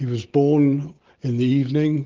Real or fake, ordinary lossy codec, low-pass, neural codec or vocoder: real; Opus, 16 kbps; 7.2 kHz; none